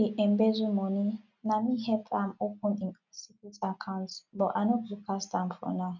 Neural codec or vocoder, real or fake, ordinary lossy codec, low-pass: none; real; none; 7.2 kHz